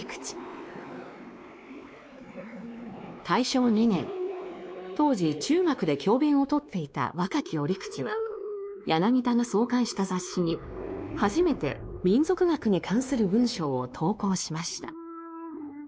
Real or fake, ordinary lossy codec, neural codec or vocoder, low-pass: fake; none; codec, 16 kHz, 2 kbps, X-Codec, WavLM features, trained on Multilingual LibriSpeech; none